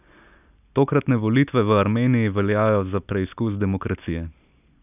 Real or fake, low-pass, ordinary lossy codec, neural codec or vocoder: real; 3.6 kHz; none; none